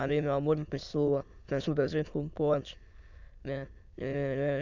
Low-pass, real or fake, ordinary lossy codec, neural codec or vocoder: 7.2 kHz; fake; none; autoencoder, 22.05 kHz, a latent of 192 numbers a frame, VITS, trained on many speakers